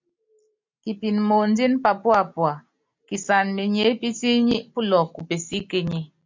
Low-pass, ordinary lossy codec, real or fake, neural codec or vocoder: 7.2 kHz; MP3, 64 kbps; real; none